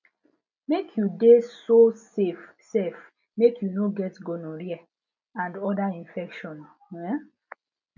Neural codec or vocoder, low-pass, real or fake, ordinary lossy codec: none; 7.2 kHz; real; none